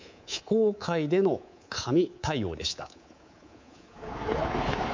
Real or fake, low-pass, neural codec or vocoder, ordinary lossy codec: fake; 7.2 kHz; codec, 24 kHz, 3.1 kbps, DualCodec; MP3, 64 kbps